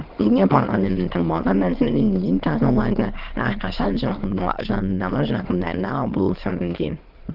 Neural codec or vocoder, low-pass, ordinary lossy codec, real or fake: autoencoder, 22.05 kHz, a latent of 192 numbers a frame, VITS, trained on many speakers; 5.4 kHz; Opus, 16 kbps; fake